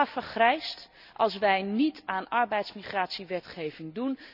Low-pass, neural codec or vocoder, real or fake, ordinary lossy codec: 5.4 kHz; none; real; none